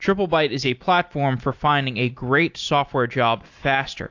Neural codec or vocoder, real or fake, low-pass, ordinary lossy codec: none; real; 7.2 kHz; AAC, 48 kbps